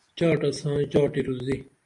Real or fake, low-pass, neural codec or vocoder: real; 10.8 kHz; none